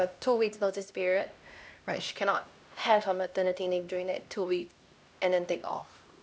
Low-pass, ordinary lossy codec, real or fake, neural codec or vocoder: none; none; fake; codec, 16 kHz, 1 kbps, X-Codec, HuBERT features, trained on LibriSpeech